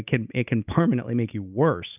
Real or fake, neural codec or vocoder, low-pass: real; none; 3.6 kHz